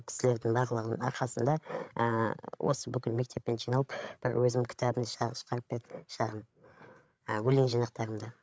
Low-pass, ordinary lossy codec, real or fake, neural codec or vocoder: none; none; fake; codec, 16 kHz, 8 kbps, FreqCodec, larger model